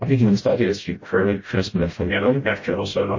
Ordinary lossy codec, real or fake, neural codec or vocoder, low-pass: MP3, 32 kbps; fake; codec, 16 kHz, 0.5 kbps, FreqCodec, smaller model; 7.2 kHz